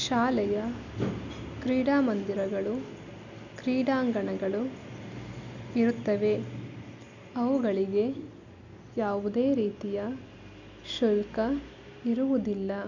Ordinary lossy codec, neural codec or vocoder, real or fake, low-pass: none; none; real; 7.2 kHz